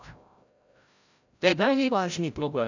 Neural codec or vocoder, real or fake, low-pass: codec, 16 kHz, 0.5 kbps, FreqCodec, larger model; fake; 7.2 kHz